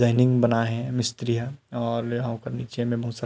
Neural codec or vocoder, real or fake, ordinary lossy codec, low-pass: none; real; none; none